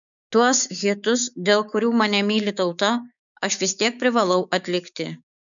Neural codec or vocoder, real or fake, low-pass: codec, 16 kHz, 6 kbps, DAC; fake; 7.2 kHz